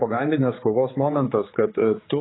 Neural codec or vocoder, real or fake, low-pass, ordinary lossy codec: codec, 16 kHz, 4 kbps, X-Codec, HuBERT features, trained on general audio; fake; 7.2 kHz; AAC, 16 kbps